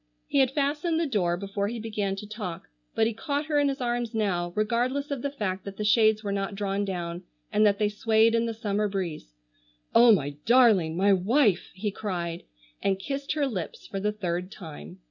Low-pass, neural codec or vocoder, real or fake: 7.2 kHz; none; real